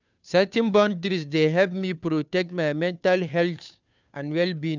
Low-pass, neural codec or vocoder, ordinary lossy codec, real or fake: 7.2 kHz; codec, 16 kHz, 2 kbps, FunCodec, trained on Chinese and English, 25 frames a second; none; fake